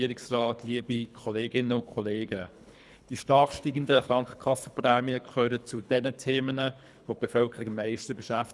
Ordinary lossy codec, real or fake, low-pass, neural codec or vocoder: none; fake; none; codec, 24 kHz, 3 kbps, HILCodec